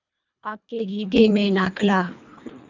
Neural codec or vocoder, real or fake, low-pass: codec, 24 kHz, 1.5 kbps, HILCodec; fake; 7.2 kHz